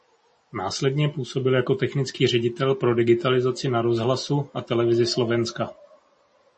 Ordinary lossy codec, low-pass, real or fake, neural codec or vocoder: MP3, 32 kbps; 10.8 kHz; real; none